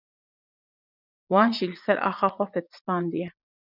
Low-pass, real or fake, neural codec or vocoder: 5.4 kHz; fake; vocoder, 44.1 kHz, 80 mel bands, Vocos